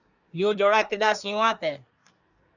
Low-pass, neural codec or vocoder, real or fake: 7.2 kHz; codec, 24 kHz, 1 kbps, SNAC; fake